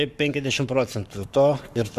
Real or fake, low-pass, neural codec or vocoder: fake; 14.4 kHz; codec, 44.1 kHz, 7.8 kbps, Pupu-Codec